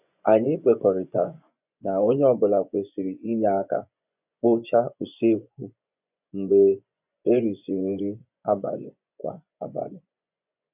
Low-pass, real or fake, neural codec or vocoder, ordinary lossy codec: 3.6 kHz; fake; vocoder, 24 kHz, 100 mel bands, Vocos; none